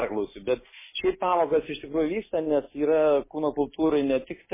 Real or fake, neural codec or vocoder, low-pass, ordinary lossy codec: real; none; 3.6 kHz; MP3, 16 kbps